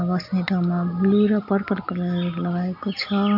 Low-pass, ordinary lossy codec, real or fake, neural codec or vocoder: 5.4 kHz; none; real; none